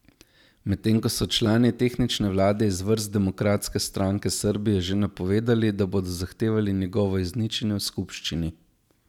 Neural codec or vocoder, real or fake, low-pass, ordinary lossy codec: none; real; 19.8 kHz; none